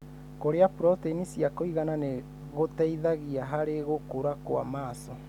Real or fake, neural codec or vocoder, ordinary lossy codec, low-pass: real; none; none; 19.8 kHz